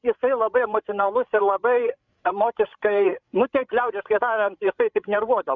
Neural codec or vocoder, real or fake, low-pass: codec, 16 kHz, 16 kbps, FreqCodec, larger model; fake; 7.2 kHz